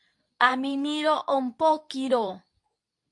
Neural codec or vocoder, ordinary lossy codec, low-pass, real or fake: codec, 24 kHz, 0.9 kbps, WavTokenizer, medium speech release version 2; MP3, 64 kbps; 10.8 kHz; fake